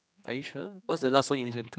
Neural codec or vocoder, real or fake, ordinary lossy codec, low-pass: codec, 16 kHz, 2 kbps, X-Codec, HuBERT features, trained on general audio; fake; none; none